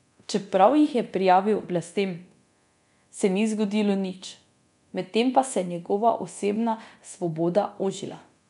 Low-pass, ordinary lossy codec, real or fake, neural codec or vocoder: 10.8 kHz; none; fake; codec, 24 kHz, 0.9 kbps, DualCodec